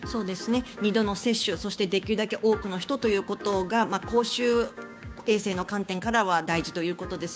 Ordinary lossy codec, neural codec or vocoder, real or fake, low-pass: none; codec, 16 kHz, 6 kbps, DAC; fake; none